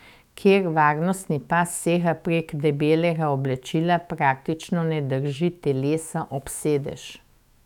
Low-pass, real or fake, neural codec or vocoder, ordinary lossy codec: 19.8 kHz; fake; autoencoder, 48 kHz, 128 numbers a frame, DAC-VAE, trained on Japanese speech; none